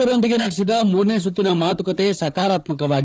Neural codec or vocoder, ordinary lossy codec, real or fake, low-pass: codec, 16 kHz, 4 kbps, FunCodec, trained on Chinese and English, 50 frames a second; none; fake; none